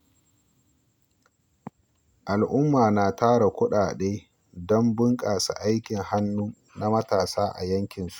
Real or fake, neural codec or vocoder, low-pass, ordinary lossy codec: real; none; 19.8 kHz; none